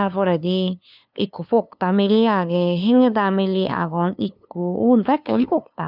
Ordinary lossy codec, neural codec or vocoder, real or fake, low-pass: none; codec, 16 kHz, 2 kbps, FunCodec, trained on LibriTTS, 25 frames a second; fake; 5.4 kHz